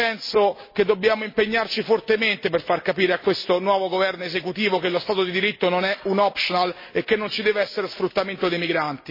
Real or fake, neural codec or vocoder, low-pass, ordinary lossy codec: real; none; 5.4 kHz; MP3, 24 kbps